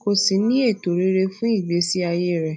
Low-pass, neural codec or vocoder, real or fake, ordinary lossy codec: none; none; real; none